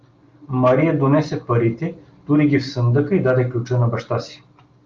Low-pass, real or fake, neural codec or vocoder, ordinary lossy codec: 7.2 kHz; real; none; Opus, 24 kbps